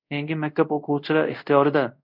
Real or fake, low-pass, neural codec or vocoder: fake; 5.4 kHz; codec, 24 kHz, 0.5 kbps, DualCodec